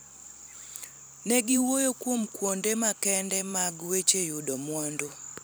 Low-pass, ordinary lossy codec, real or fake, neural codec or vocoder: none; none; fake; vocoder, 44.1 kHz, 128 mel bands every 256 samples, BigVGAN v2